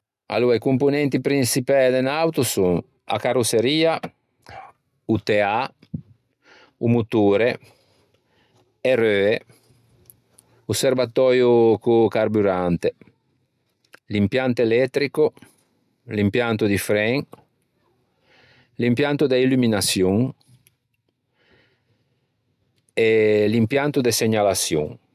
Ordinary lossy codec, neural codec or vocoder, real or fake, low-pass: none; none; real; 14.4 kHz